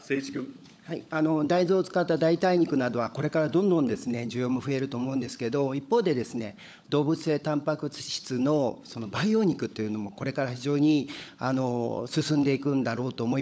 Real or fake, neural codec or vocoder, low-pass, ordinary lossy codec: fake; codec, 16 kHz, 16 kbps, FunCodec, trained on LibriTTS, 50 frames a second; none; none